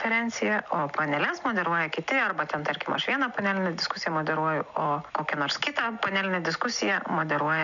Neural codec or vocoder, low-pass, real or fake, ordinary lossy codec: none; 7.2 kHz; real; MP3, 96 kbps